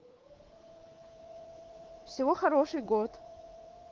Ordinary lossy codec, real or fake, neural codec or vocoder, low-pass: Opus, 16 kbps; fake; codec, 24 kHz, 3.1 kbps, DualCodec; 7.2 kHz